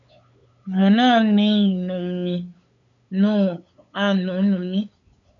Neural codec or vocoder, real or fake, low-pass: codec, 16 kHz, 8 kbps, FunCodec, trained on LibriTTS, 25 frames a second; fake; 7.2 kHz